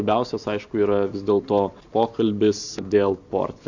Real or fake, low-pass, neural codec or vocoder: real; 7.2 kHz; none